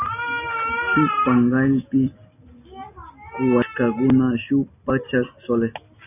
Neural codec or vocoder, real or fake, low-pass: none; real; 3.6 kHz